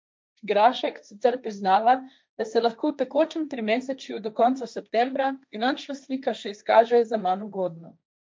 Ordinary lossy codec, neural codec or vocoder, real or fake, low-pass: none; codec, 16 kHz, 1.1 kbps, Voila-Tokenizer; fake; none